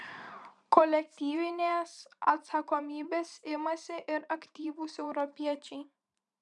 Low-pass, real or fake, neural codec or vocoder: 10.8 kHz; fake; vocoder, 44.1 kHz, 128 mel bands every 512 samples, BigVGAN v2